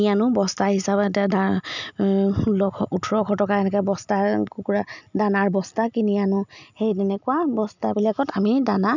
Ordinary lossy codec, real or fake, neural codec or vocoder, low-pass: none; real; none; 7.2 kHz